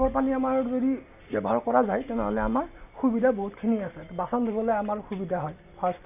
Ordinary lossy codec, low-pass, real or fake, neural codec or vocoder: none; 3.6 kHz; real; none